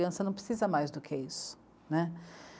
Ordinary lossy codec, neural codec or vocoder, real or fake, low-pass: none; none; real; none